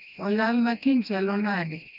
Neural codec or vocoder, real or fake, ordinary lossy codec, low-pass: codec, 16 kHz, 1 kbps, FreqCodec, smaller model; fake; none; 5.4 kHz